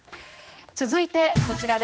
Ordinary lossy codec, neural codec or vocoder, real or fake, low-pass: none; codec, 16 kHz, 2 kbps, X-Codec, HuBERT features, trained on general audio; fake; none